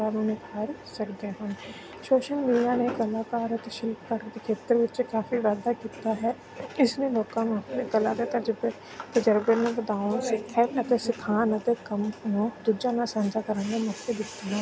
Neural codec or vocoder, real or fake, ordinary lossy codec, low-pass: none; real; none; none